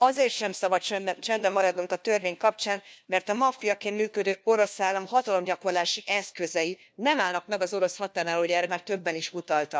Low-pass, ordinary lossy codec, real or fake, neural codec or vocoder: none; none; fake; codec, 16 kHz, 1 kbps, FunCodec, trained on LibriTTS, 50 frames a second